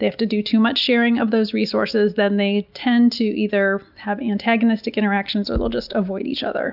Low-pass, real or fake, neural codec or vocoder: 5.4 kHz; real; none